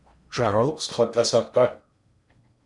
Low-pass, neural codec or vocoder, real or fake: 10.8 kHz; codec, 16 kHz in and 24 kHz out, 0.8 kbps, FocalCodec, streaming, 65536 codes; fake